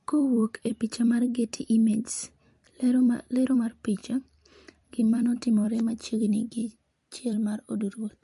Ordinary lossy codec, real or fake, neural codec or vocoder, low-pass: MP3, 48 kbps; fake; vocoder, 44.1 kHz, 128 mel bands every 256 samples, BigVGAN v2; 14.4 kHz